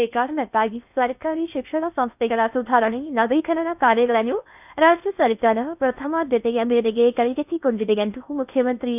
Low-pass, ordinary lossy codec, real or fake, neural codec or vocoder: 3.6 kHz; none; fake; codec, 16 kHz in and 24 kHz out, 0.6 kbps, FocalCodec, streaming, 2048 codes